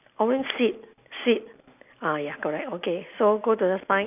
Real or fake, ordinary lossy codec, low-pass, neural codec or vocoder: real; none; 3.6 kHz; none